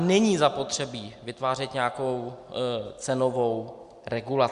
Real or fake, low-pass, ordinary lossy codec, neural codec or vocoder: real; 10.8 kHz; Opus, 64 kbps; none